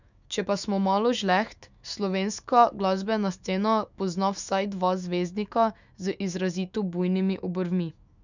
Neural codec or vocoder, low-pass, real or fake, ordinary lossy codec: autoencoder, 48 kHz, 128 numbers a frame, DAC-VAE, trained on Japanese speech; 7.2 kHz; fake; none